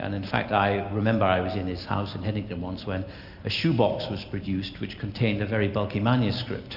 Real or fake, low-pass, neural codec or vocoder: real; 5.4 kHz; none